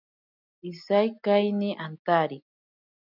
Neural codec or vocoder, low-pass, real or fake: none; 5.4 kHz; real